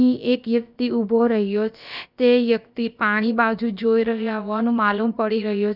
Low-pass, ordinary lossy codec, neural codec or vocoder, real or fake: 5.4 kHz; none; codec, 16 kHz, about 1 kbps, DyCAST, with the encoder's durations; fake